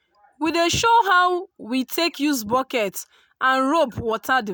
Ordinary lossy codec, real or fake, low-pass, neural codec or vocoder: none; real; none; none